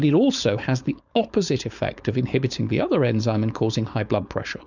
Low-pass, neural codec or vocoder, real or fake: 7.2 kHz; codec, 16 kHz, 4.8 kbps, FACodec; fake